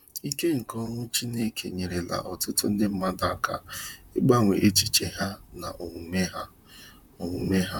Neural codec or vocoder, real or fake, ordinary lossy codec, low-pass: vocoder, 44.1 kHz, 128 mel bands, Pupu-Vocoder; fake; none; 19.8 kHz